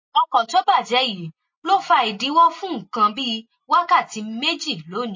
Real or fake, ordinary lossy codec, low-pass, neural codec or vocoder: real; MP3, 32 kbps; 7.2 kHz; none